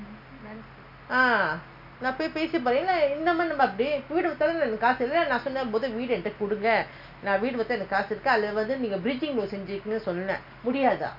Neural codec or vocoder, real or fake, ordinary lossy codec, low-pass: none; real; none; 5.4 kHz